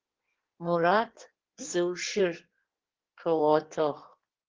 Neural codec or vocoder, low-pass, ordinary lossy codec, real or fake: codec, 16 kHz in and 24 kHz out, 1.1 kbps, FireRedTTS-2 codec; 7.2 kHz; Opus, 16 kbps; fake